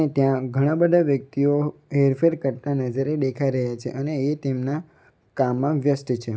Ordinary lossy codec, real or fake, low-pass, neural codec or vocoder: none; real; none; none